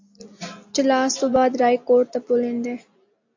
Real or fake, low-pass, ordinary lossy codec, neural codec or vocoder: real; 7.2 kHz; AAC, 48 kbps; none